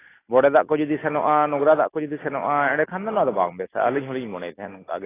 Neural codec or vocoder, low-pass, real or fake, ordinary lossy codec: none; 3.6 kHz; real; AAC, 16 kbps